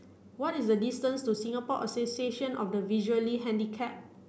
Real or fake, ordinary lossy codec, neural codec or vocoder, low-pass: real; none; none; none